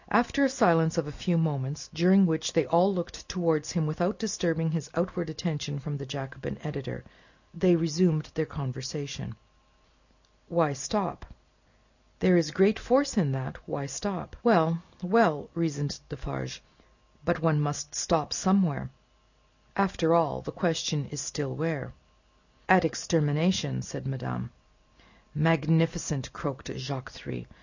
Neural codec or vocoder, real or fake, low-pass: none; real; 7.2 kHz